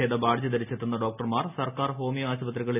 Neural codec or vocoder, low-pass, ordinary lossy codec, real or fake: none; 3.6 kHz; none; real